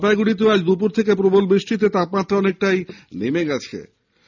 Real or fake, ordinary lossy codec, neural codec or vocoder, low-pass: real; none; none; 7.2 kHz